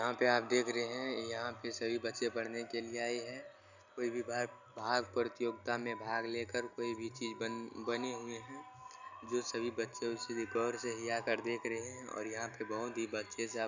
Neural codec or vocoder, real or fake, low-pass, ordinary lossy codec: none; real; 7.2 kHz; none